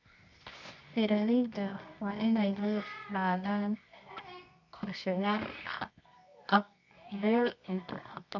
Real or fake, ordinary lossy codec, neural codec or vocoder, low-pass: fake; none; codec, 24 kHz, 0.9 kbps, WavTokenizer, medium music audio release; 7.2 kHz